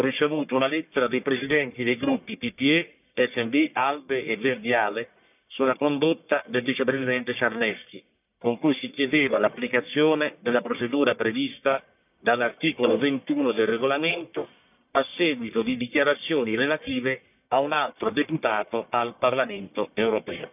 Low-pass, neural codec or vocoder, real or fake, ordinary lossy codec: 3.6 kHz; codec, 44.1 kHz, 1.7 kbps, Pupu-Codec; fake; none